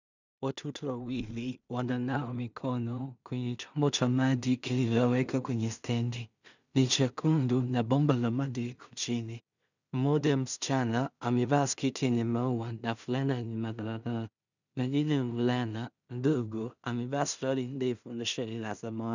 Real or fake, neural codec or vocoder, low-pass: fake; codec, 16 kHz in and 24 kHz out, 0.4 kbps, LongCat-Audio-Codec, two codebook decoder; 7.2 kHz